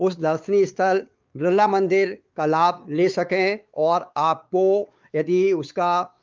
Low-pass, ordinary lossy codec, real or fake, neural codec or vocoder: 7.2 kHz; Opus, 32 kbps; fake; codec, 16 kHz, 4 kbps, X-Codec, WavLM features, trained on Multilingual LibriSpeech